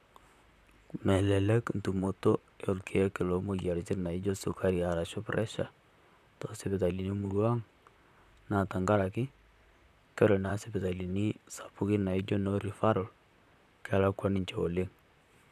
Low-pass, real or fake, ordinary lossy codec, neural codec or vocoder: 14.4 kHz; fake; none; vocoder, 44.1 kHz, 128 mel bands, Pupu-Vocoder